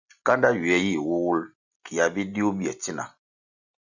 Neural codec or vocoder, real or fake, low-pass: none; real; 7.2 kHz